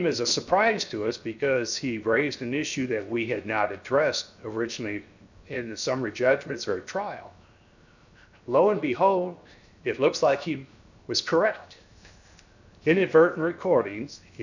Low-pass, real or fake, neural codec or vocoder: 7.2 kHz; fake; codec, 16 kHz, 0.7 kbps, FocalCodec